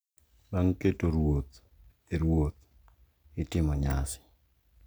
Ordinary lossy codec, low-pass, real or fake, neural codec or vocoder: none; none; real; none